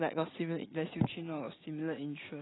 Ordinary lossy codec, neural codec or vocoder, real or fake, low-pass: AAC, 16 kbps; none; real; 7.2 kHz